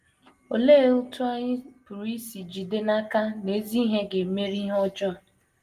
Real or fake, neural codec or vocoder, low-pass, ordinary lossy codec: real; none; 14.4 kHz; Opus, 24 kbps